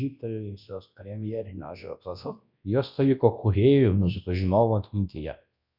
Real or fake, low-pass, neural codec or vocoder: fake; 5.4 kHz; codec, 24 kHz, 0.9 kbps, WavTokenizer, large speech release